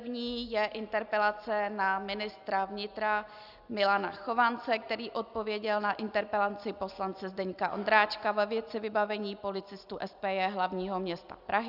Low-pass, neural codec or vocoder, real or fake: 5.4 kHz; none; real